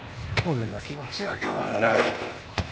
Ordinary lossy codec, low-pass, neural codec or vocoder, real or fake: none; none; codec, 16 kHz, 0.8 kbps, ZipCodec; fake